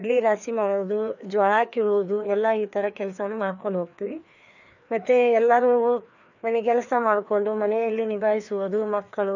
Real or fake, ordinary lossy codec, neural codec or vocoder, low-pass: fake; none; codec, 16 kHz, 2 kbps, FreqCodec, larger model; 7.2 kHz